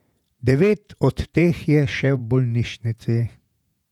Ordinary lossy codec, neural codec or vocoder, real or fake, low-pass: none; vocoder, 48 kHz, 128 mel bands, Vocos; fake; 19.8 kHz